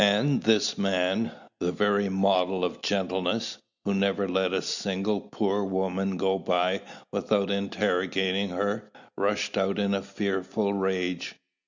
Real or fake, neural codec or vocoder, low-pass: real; none; 7.2 kHz